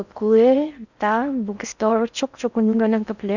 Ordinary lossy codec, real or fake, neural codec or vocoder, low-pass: none; fake; codec, 16 kHz in and 24 kHz out, 0.6 kbps, FocalCodec, streaming, 4096 codes; 7.2 kHz